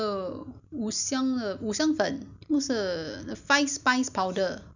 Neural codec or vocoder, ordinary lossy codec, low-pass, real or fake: none; none; 7.2 kHz; real